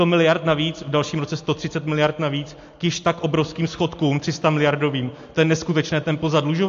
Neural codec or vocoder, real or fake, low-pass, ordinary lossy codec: none; real; 7.2 kHz; AAC, 48 kbps